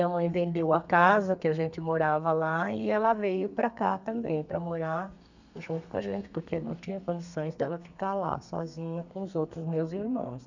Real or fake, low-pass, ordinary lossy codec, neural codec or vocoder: fake; 7.2 kHz; none; codec, 32 kHz, 1.9 kbps, SNAC